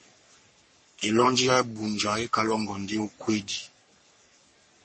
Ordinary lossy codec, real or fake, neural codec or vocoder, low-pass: MP3, 32 kbps; fake; codec, 44.1 kHz, 3.4 kbps, Pupu-Codec; 10.8 kHz